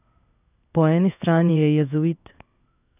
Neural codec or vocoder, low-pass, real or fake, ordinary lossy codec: codec, 16 kHz in and 24 kHz out, 1 kbps, XY-Tokenizer; 3.6 kHz; fake; none